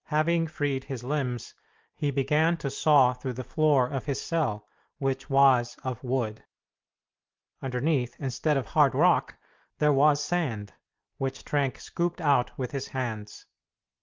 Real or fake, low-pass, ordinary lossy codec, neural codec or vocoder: real; 7.2 kHz; Opus, 32 kbps; none